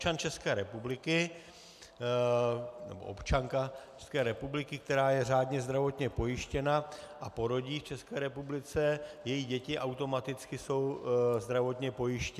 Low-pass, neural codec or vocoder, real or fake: 14.4 kHz; none; real